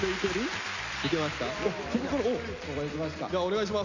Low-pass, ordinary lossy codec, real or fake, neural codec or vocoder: 7.2 kHz; none; real; none